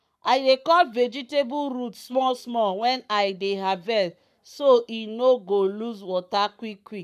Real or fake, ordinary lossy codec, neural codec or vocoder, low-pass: fake; none; codec, 44.1 kHz, 7.8 kbps, Pupu-Codec; 14.4 kHz